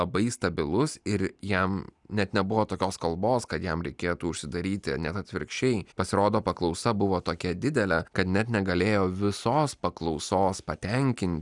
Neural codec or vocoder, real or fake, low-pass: none; real; 10.8 kHz